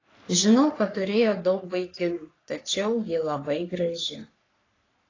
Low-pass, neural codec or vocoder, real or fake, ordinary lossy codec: 7.2 kHz; codec, 44.1 kHz, 3.4 kbps, Pupu-Codec; fake; AAC, 32 kbps